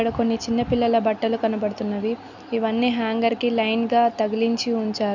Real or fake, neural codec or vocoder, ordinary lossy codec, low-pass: real; none; none; 7.2 kHz